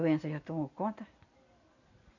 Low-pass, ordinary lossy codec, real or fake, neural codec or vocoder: 7.2 kHz; none; real; none